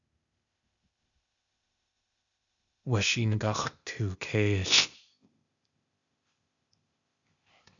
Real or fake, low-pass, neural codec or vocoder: fake; 7.2 kHz; codec, 16 kHz, 0.8 kbps, ZipCodec